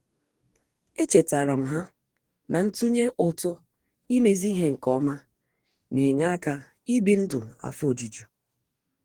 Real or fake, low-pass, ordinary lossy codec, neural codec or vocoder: fake; 19.8 kHz; Opus, 24 kbps; codec, 44.1 kHz, 2.6 kbps, DAC